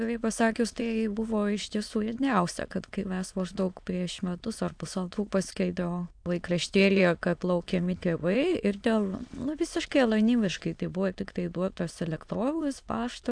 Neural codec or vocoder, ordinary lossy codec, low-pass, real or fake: autoencoder, 22.05 kHz, a latent of 192 numbers a frame, VITS, trained on many speakers; AAC, 64 kbps; 9.9 kHz; fake